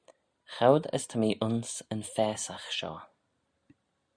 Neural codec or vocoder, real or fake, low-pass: none; real; 9.9 kHz